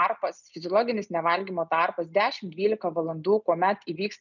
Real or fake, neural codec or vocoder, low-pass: real; none; 7.2 kHz